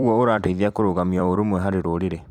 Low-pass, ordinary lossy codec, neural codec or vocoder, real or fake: 19.8 kHz; Opus, 64 kbps; vocoder, 44.1 kHz, 128 mel bands every 256 samples, BigVGAN v2; fake